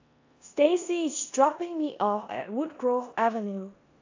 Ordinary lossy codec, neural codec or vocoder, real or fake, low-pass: AAC, 48 kbps; codec, 16 kHz in and 24 kHz out, 0.9 kbps, LongCat-Audio-Codec, four codebook decoder; fake; 7.2 kHz